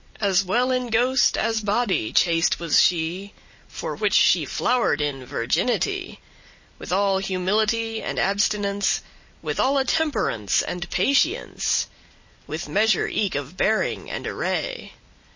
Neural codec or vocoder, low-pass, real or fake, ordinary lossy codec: none; 7.2 kHz; real; MP3, 32 kbps